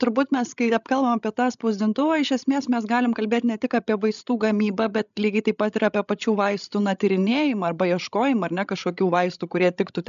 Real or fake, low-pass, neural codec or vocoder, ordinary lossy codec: fake; 7.2 kHz; codec, 16 kHz, 16 kbps, FreqCodec, larger model; MP3, 96 kbps